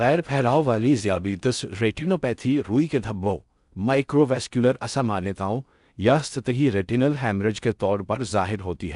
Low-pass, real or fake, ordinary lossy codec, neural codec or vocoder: 10.8 kHz; fake; none; codec, 16 kHz in and 24 kHz out, 0.6 kbps, FocalCodec, streaming, 4096 codes